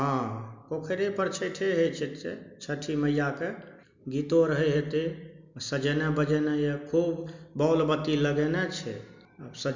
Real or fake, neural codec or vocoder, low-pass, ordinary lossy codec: real; none; 7.2 kHz; MP3, 64 kbps